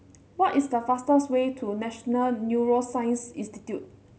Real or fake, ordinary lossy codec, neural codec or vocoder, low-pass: real; none; none; none